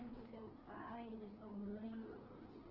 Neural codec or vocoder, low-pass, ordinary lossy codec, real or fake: codec, 16 kHz, 2 kbps, FreqCodec, larger model; 5.4 kHz; Opus, 16 kbps; fake